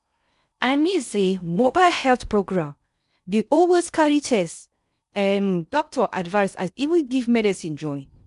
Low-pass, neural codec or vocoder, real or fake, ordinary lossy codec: 10.8 kHz; codec, 16 kHz in and 24 kHz out, 0.6 kbps, FocalCodec, streaming, 4096 codes; fake; none